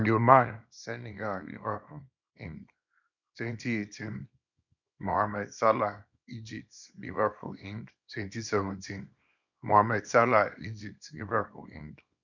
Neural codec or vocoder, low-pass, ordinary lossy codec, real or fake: codec, 24 kHz, 0.9 kbps, WavTokenizer, small release; 7.2 kHz; none; fake